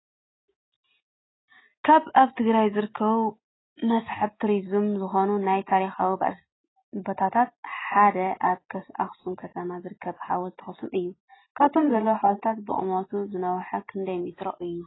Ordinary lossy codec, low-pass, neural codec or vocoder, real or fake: AAC, 16 kbps; 7.2 kHz; none; real